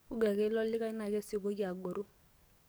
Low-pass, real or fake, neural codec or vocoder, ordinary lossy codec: none; fake; codec, 44.1 kHz, 7.8 kbps, DAC; none